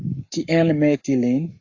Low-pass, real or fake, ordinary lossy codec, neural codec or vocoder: 7.2 kHz; fake; AAC, 48 kbps; codec, 44.1 kHz, 7.8 kbps, Pupu-Codec